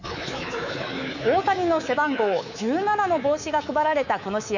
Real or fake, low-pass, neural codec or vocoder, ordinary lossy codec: fake; 7.2 kHz; codec, 24 kHz, 3.1 kbps, DualCodec; none